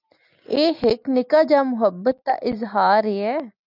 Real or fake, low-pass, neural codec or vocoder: real; 5.4 kHz; none